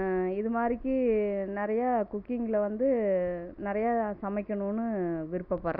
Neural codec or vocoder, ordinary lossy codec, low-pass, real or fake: none; none; 5.4 kHz; real